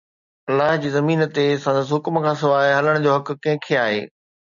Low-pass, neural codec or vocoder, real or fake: 7.2 kHz; none; real